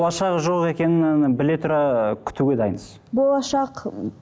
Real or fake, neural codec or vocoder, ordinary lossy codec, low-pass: real; none; none; none